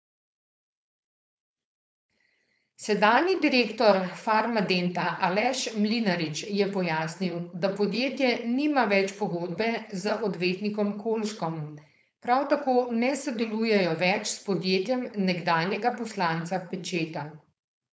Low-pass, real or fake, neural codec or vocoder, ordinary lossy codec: none; fake; codec, 16 kHz, 4.8 kbps, FACodec; none